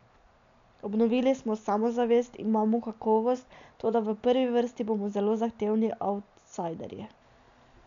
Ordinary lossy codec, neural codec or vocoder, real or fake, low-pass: MP3, 96 kbps; none; real; 7.2 kHz